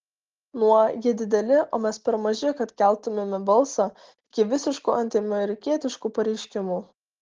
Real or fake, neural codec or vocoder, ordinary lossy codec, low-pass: real; none; Opus, 16 kbps; 7.2 kHz